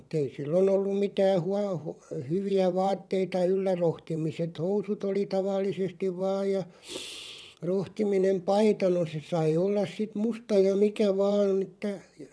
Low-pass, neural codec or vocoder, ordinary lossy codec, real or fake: none; vocoder, 22.05 kHz, 80 mel bands, WaveNeXt; none; fake